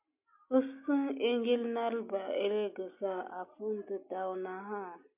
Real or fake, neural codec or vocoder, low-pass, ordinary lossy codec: real; none; 3.6 kHz; MP3, 32 kbps